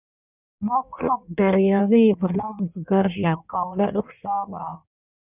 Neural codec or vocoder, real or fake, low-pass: codec, 16 kHz in and 24 kHz out, 1.1 kbps, FireRedTTS-2 codec; fake; 3.6 kHz